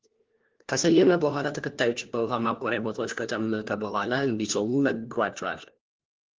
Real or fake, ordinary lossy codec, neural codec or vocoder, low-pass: fake; Opus, 16 kbps; codec, 16 kHz, 1 kbps, FunCodec, trained on LibriTTS, 50 frames a second; 7.2 kHz